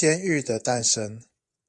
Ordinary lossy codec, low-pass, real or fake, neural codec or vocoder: AAC, 64 kbps; 10.8 kHz; fake; vocoder, 24 kHz, 100 mel bands, Vocos